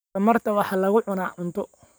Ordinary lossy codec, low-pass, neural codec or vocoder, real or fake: none; none; none; real